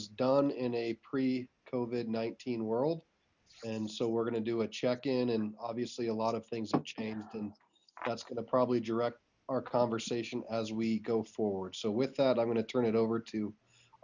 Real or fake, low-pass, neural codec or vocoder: real; 7.2 kHz; none